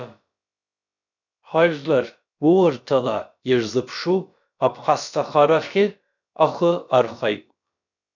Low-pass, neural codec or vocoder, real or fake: 7.2 kHz; codec, 16 kHz, about 1 kbps, DyCAST, with the encoder's durations; fake